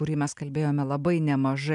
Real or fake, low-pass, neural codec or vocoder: real; 10.8 kHz; none